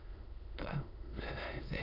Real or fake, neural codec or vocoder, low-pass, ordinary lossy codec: fake; autoencoder, 22.05 kHz, a latent of 192 numbers a frame, VITS, trained on many speakers; 5.4 kHz; none